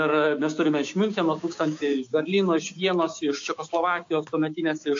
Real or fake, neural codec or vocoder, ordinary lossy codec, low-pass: fake; codec, 16 kHz, 6 kbps, DAC; AAC, 48 kbps; 7.2 kHz